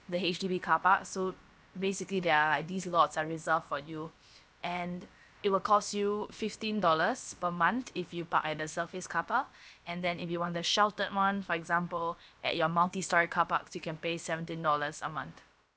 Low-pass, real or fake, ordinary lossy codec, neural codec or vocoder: none; fake; none; codec, 16 kHz, about 1 kbps, DyCAST, with the encoder's durations